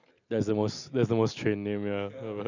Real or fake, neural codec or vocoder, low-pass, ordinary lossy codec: real; none; 7.2 kHz; none